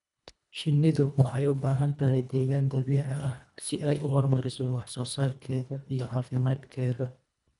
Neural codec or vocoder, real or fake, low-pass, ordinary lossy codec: codec, 24 kHz, 1.5 kbps, HILCodec; fake; 10.8 kHz; none